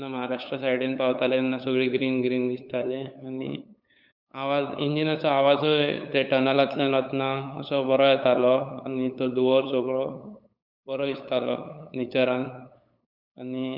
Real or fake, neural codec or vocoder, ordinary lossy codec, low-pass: fake; codec, 16 kHz, 8 kbps, FunCodec, trained on LibriTTS, 25 frames a second; none; 5.4 kHz